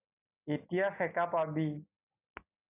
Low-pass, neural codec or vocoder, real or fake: 3.6 kHz; none; real